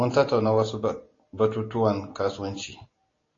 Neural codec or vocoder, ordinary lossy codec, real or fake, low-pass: none; AAC, 32 kbps; real; 7.2 kHz